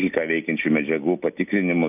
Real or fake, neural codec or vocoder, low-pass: real; none; 3.6 kHz